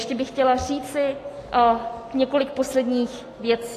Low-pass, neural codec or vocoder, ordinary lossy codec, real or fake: 14.4 kHz; none; AAC, 48 kbps; real